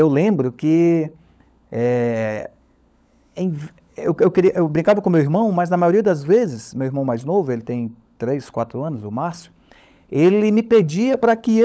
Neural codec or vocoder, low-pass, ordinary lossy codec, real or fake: codec, 16 kHz, 16 kbps, FunCodec, trained on LibriTTS, 50 frames a second; none; none; fake